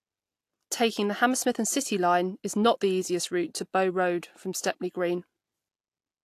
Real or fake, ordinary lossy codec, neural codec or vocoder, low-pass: real; AAC, 64 kbps; none; 14.4 kHz